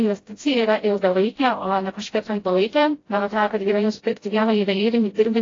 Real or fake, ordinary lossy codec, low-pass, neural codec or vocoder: fake; AAC, 32 kbps; 7.2 kHz; codec, 16 kHz, 0.5 kbps, FreqCodec, smaller model